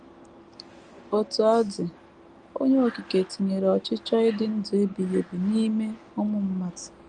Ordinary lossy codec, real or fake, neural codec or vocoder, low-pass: Opus, 32 kbps; real; none; 9.9 kHz